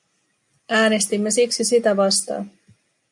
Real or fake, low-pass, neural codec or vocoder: real; 10.8 kHz; none